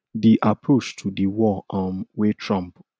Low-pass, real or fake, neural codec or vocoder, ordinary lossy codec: none; real; none; none